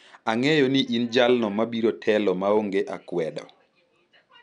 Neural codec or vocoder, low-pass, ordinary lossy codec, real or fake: none; 9.9 kHz; none; real